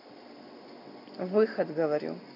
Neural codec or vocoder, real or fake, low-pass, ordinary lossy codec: none; real; 5.4 kHz; none